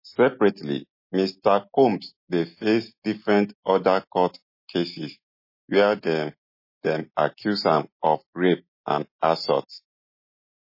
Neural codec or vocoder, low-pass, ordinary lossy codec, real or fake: none; 5.4 kHz; MP3, 24 kbps; real